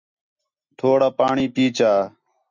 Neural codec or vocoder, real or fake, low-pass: none; real; 7.2 kHz